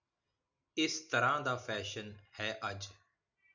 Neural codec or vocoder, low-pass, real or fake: none; 7.2 kHz; real